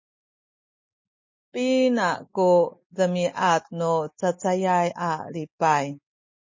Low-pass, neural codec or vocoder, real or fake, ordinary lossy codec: 7.2 kHz; none; real; MP3, 32 kbps